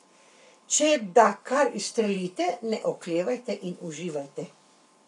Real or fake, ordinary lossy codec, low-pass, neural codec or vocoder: fake; none; 10.8 kHz; codec, 44.1 kHz, 7.8 kbps, Pupu-Codec